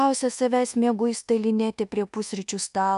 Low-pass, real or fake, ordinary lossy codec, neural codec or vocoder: 10.8 kHz; fake; MP3, 96 kbps; codec, 24 kHz, 1.2 kbps, DualCodec